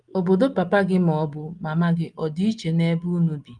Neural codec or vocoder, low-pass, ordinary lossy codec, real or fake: none; 9.9 kHz; Opus, 32 kbps; real